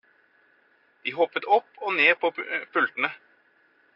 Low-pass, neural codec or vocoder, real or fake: 5.4 kHz; none; real